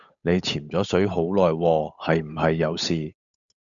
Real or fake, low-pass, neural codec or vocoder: fake; 7.2 kHz; codec, 16 kHz, 8 kbps, FunCodec, trained on Chinese and English, 25 frames a second